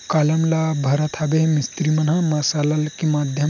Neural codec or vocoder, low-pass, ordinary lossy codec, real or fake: none; 7.2 kHz; none; real